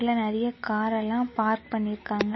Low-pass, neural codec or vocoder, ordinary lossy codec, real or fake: 7.2 kHz; none; MP3, 24 kbps; real